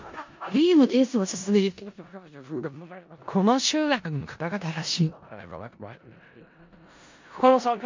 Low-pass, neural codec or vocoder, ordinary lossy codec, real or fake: 7.2 kHz; codec, 16 kHz in and 24 kHz out, 0.4 kbps, LongCat-Audio-Codec, four codebook decoder; MP3, 64 kbps; fake